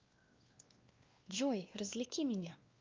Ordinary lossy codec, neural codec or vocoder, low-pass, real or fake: Opus, 32 kbps; codec, 16 kHz, 2 kbps, X-Codec, WavLM features, trained on Multilingual LibriSpeech; 7.2 kHz; fake